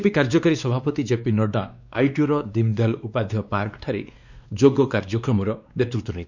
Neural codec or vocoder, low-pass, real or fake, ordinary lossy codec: codec, 16 kHz, 2 kbps, X-Codec, WavLM features, trained on Multilingual LibriSpeech; 7.2 kHz; fake; none